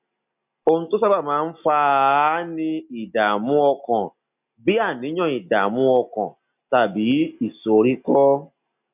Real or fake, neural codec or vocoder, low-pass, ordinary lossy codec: real; none; 3.6 kHz; none